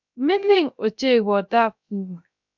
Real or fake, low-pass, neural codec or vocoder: fake; 7.2 kHz; codec, 16 kHz, 0.3 kbps, FocalCodec